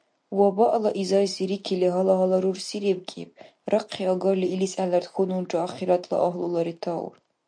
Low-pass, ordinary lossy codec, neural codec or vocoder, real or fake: 9.9 kHz; MP3, 64 kbps; none; real